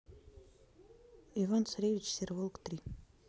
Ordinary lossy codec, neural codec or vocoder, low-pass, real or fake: none; none; none; real